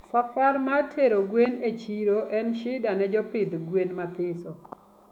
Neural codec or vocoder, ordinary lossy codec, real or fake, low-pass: autoencoder, 48 kHz, 128 numbers a frame, DAC-VAE, trained on Japanese speech; none; fake; 19.8 kHz